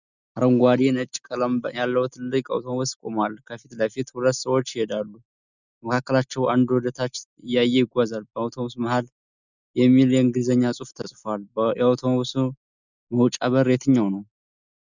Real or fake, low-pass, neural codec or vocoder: real; 7.2 kHz; none